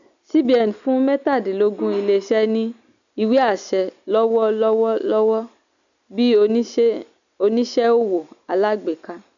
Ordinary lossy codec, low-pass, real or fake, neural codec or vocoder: none; 7.2 kHz; real; none